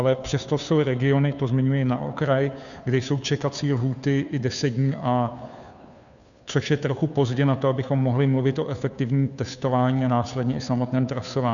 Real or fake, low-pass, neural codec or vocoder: fake; 7.2 kHz; codec, 16 kHz, 2 kbps, FunCodec, trained on Chinese and English, 25 frames a second